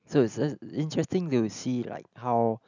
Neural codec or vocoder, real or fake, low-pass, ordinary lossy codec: none; real; 7.2 kHz; none